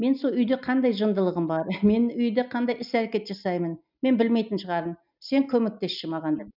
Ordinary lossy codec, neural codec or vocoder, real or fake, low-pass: none; none; real; 5.4 kHz